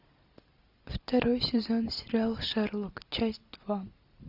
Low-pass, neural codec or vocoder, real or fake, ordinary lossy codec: 5.4 kHz; none; real; AAC, 48 kbps